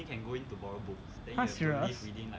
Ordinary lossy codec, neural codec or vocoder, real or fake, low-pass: none; none; real; none